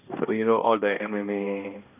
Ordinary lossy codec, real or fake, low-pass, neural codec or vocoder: none; fake; 3.6 kHz; codec, 16 kHz, 1 kbps, X-Codec, HuBERT features, trained on balanced general audio